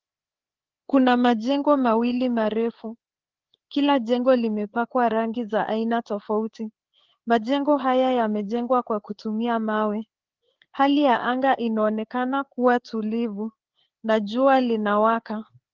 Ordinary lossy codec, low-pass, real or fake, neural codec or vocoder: Opus, 16 kbps; 7.2 kHz; fake; codec, 16 kHz, 4 kbps, FreqCodec, larger model